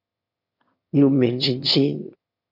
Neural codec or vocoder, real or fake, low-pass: autoencoder, 22.05 kHz, a latent of 192 numbers a frame, VITS, trained on one speaker; fake; 5.4 kHz